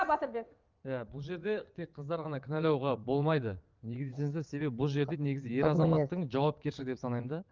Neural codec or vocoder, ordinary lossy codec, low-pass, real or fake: vocoder, 22.05 kHz, 80 mel bands, WaveNeXt; Opus, 32 kbps; 7.2 kHz; fake